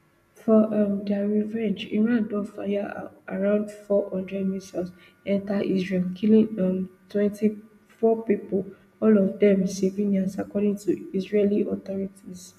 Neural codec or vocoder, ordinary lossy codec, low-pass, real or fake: none; none; 14.4 kHz; real